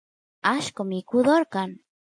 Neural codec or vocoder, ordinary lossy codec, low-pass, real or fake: none; MP3, 64 kbps; 9.9 kHz; real